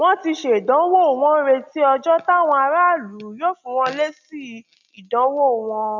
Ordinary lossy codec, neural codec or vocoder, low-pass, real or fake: none; none; 7.2 kHz; real